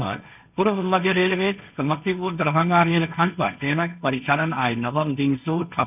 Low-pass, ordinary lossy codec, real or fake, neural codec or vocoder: 3.6 kHz; none; fake; codec, 16 kHz, 1.1 kbps, Voila-Tokenizer